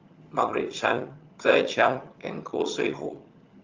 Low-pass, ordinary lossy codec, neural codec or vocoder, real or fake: 7.2 kHz; Opus, 32 kbps; vocoder, 22.05 kHz, 80 mel bands, HiFi-GAN; fake